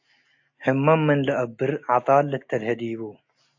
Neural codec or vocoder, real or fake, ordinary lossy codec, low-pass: none; real; AAC, 48 kbps; 7.2 kHz